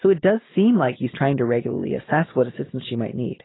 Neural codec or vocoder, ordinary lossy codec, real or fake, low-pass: none; AAC, 16 kbps; real; 7.2 kHz